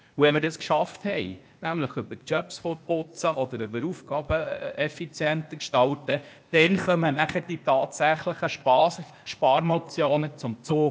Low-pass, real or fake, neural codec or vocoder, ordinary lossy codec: none; fake; codec, 16 kHz, 0.8 kbps, ZipCodec; none